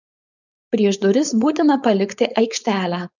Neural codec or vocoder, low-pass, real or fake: codec, 16 kHz, 4.8 kbps, FACodec; 7.2 kHz; fake